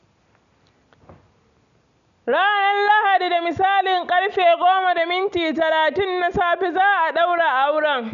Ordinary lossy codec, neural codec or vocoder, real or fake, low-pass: none; none; real; 7.2 kHz